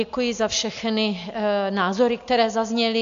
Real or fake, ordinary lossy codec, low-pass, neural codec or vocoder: real; AAC, 64 kbps; 7.2 kHz; none